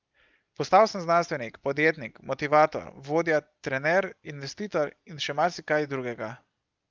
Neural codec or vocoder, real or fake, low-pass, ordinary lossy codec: none; real; 7.2 kHz; Opus, 32 kbps